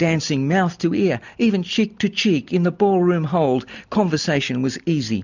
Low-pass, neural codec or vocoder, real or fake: 7.2 kHz; none; real